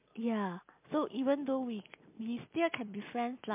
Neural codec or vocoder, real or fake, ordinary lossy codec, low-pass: none; real; MP3, 32 kbps; 3.6 kHz